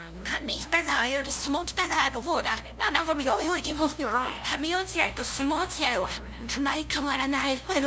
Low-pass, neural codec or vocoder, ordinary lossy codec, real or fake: none; codec, 16 kHz, 0.5 kbps, FunCodec, trained on LibriTTS, 25 frames a second; none; fake